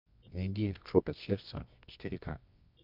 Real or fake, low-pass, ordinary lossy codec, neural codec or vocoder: fake; 5.4 kHz; MP3, 48 kbps; codec, 24 kHz, 0.9 kbps, WavTokenizer, medium music audio release